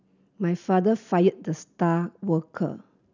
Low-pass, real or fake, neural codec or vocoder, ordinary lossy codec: 7.2 kHz; real; none; none